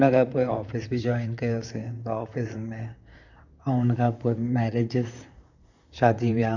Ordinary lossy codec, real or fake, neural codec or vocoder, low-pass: none; fake; vocoder, 44.1 kHz, 128 mel bands, Pupu-Vocoder; 7.2 kHz